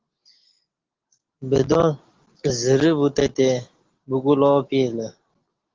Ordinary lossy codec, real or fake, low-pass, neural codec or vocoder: Opus, 16 kbps; real; 7.2 kHz; none